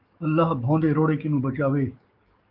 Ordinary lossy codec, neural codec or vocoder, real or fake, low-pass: Opus, 24 kbps; codec, 44.1 kHz, 7.8 kbps, DAC; fake; 5.4 kHz